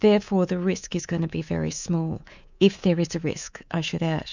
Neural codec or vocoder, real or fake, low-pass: codec, 24 kHz, 3.1 kbps, DualCodec; fake; 7.2 kHz